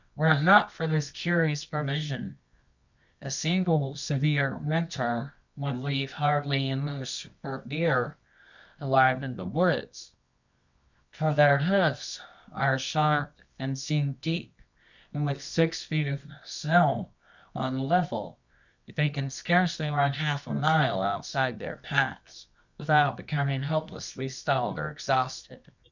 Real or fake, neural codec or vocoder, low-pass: fake; codec, 24 kHz, 0.9 kbps, WavTokenizer, medium music audio release; 7.2 kHz